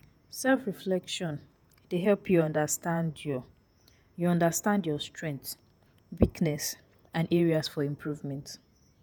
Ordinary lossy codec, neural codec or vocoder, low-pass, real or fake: none; vocoder, 48 kHz, 128 mel bands, Vocos; none; fake